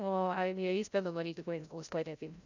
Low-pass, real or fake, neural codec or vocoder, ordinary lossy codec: 7.2 kHz; fake; codec, 16 kHz, 0.5 kbps, FreqCodec, larger model; none